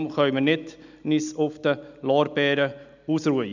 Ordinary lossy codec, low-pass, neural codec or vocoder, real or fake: none; 7.2 kHz; none; real